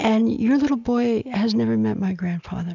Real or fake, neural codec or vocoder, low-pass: real; none; 7.2 kHz